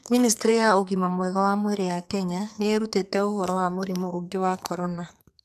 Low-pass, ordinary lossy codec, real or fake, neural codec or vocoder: 14.4 kHz; none; fake; codec, 44.1 kHz, 2.6 kbps, SNAC